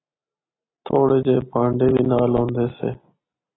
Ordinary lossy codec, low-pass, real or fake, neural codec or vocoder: AAC, 16 kbps; 7.2 kHz; real; none